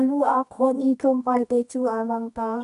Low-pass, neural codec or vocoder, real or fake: 10.8 kHz; codec, 24 kHz, 0.9 kbps, WavTokenizer, medium music audio release; fake